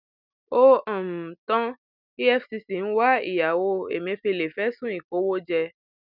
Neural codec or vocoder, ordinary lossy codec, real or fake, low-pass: none; none; real; 5.4 kHz